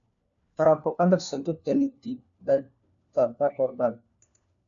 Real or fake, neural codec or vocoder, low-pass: fake; codec, 16 kHz, 1 kbps, FunCodec, trained on LibriTTS, 50 frames a second; 7.2 kHz